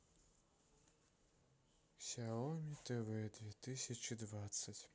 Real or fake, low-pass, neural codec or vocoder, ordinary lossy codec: real; none; none; none